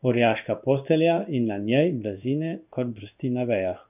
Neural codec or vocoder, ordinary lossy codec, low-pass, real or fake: codec, 16 kHz in and 24 kHz out, 1 kbps, XY-Tokenizer; none; 3.6 kHz; fake